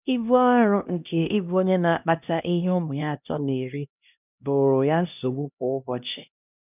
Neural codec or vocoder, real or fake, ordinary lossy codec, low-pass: codec, 16 kHz, 1 kbps, X-Codec, HuBERT features, trained on LibriSpeech; fake; none; 3.6 kHz